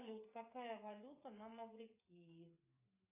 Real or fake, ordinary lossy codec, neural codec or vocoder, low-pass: fake; AAC, 24 kbps; codec, 16 kHz, 8 kbps, FreqCodec, smaller model; 3.6 kHz